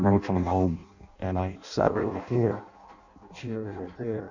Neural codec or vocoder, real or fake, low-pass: codec, 16 kHz in and 24 kHz out, 0.6 kbps, FireRedTTS-2 codec; fake; 7.2 kHz